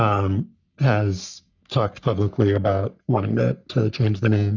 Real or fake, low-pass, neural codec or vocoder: fake; 7.2 kHz; codec, 44.1 kHz, 3.4 kbps, Pupu-Codec